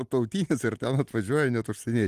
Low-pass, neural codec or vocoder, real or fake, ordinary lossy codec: 14.4 kHz; none; real; Opus, 24 kbps